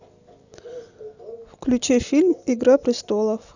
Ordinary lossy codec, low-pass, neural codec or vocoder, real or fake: none; 7.2 kHz; none; real